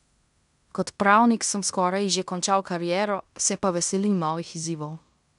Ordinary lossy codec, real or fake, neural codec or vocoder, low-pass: none; fake; codec, 16 kHz in and 24 kHz out, 0.9 kbps, LongCat-Audio-Codec, fine tuned four codebook decoder; 10.8 kHz